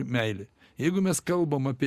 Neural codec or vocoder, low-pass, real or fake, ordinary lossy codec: none; 14.4 kHz; real; Opus, 64 kbps